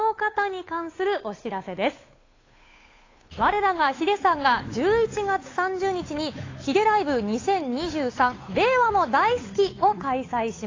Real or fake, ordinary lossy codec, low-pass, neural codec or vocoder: fake; AAC, 32 kbps; 7.2 kHz; codec, 16 kHz, 8 kbps, FunCodec, trained on Chinese and English, 25 frames a second